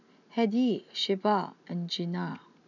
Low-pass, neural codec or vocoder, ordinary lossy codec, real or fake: 7.2 kHz; none; none; real